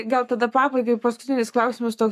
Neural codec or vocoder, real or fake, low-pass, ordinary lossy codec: codec, 44.1 kHz, 7.8 kbps, Pupu-Codec; fake; 14.4 kHz; AAC, 96 kbps